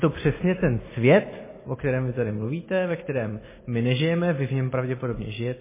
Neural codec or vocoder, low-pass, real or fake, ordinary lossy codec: none; 3.6 kHz; real; MP3, 16 kbps